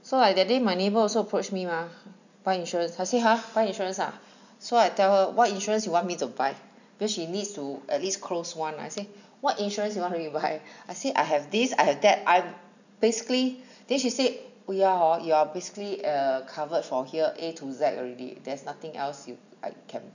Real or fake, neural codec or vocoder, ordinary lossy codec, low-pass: real; none; none; 7.2 kHz